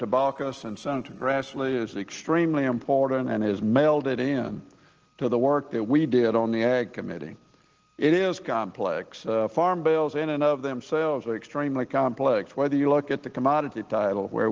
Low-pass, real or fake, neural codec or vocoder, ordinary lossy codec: 7.2 kHz; real; none; Opus, 16 kbps